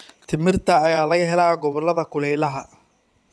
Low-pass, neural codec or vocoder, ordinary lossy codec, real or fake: none; vocoder, 22.05 kHz, 80 mel bands, WaveNeXt; none; fake